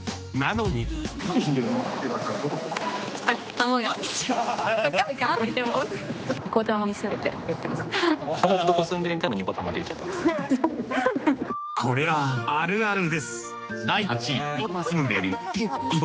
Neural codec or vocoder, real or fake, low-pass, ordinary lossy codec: codec, 16 kHz, 2 kbps, X-Codec, HuBERT features, trained on general audio; fake; none; none